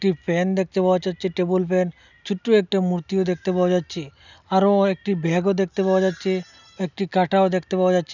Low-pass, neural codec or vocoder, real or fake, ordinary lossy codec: 7.2 kHz; none; real; none